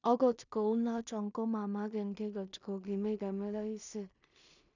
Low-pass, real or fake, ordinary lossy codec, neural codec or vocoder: 7.2 kHz; fake; none; codec, 16 kHz in and 24 kHz out, 0.4 kbps, LongCat-Audio-Codec, two codebook decoder